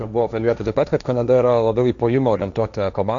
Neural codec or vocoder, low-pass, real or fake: codec, 16 kHz, 1.1 kbps, Voila-Tokenizer; 7.2 kHz; fake